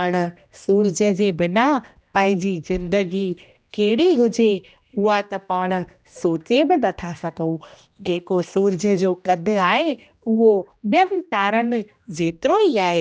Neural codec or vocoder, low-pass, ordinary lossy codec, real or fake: codec, 16 kHz, 1 kbps, X-Codec, HuBERT features, trained on general audio; none; none; fake